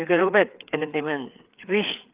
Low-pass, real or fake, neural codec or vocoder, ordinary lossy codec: 3.6 kHz; fake; codec, 16 kHz, 4 kbps, FreqCodec, larger model; Opus, 32 kbps